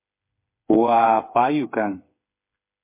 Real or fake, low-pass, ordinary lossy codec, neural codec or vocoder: fake; 3.6 kHz; MP3, 24 kbps; codec, 16 kHz, 8 kbps, FreqCodec, smaller model